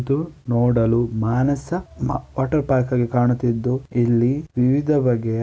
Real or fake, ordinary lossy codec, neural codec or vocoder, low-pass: real; none; none; none